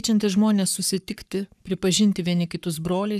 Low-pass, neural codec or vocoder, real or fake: 14.4 kHz; codec, 44.1 kHz, 7.8 kbps, Pupu-Codec; fake